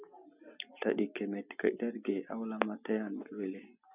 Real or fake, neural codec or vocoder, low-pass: real; none; 3.6 kHz